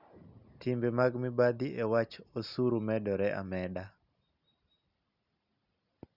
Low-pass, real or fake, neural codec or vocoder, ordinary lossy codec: 5.4 kHz; real; none; Opus, 64 kbps